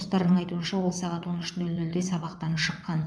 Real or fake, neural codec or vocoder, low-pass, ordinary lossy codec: fake; vocoder, 22.05 kHz, 80 mel bands, WaveNeXt; none; none